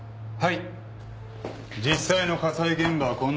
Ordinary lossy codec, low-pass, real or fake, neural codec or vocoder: none; none; real; none